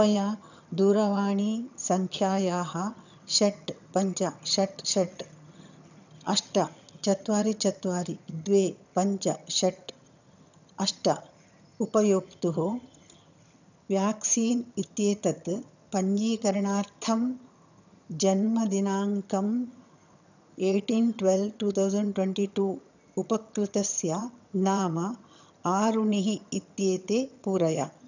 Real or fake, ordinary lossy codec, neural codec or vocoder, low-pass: fake; none; vocoder, 22.05 kHz, 80 mel bands, HiFi-GAN; 7.2 kHz